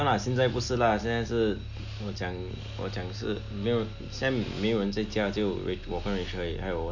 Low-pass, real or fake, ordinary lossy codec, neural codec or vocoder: 7.2 kHz; real; none; none